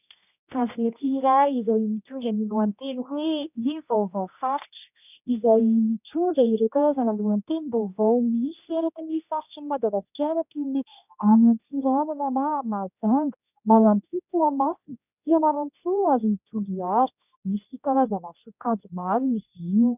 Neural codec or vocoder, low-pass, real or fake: codec, 16 kHz, 1 kbps, X-Codec, HuBERT features, trained on general audio; 3.6 kHz; fake